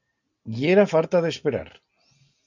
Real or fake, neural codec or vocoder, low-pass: real; none; 7.2 kHz